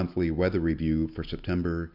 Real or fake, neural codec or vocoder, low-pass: real; none; 5.4 kHz